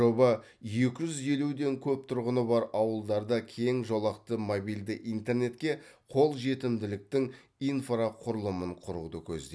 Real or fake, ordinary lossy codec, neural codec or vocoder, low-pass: real; none; none; none